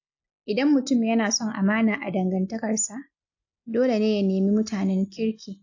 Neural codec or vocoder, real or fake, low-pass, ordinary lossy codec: none; real; 7.2 kHz; AAC, 48 kbps